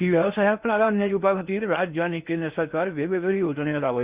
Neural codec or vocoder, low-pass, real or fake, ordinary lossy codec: codec, 16 kHz in and 24 kHz out, 0.6 kbps, FocalCodec, streaming, 4096 codes; 3.6 kHz; fake; Opus, 64 kbps